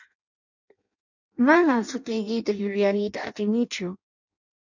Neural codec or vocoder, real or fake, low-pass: codec, 16 kHz in and 24 kHz out, 0.6 kbps, FireRedTTS-2 codec; fake; 7.2 kHz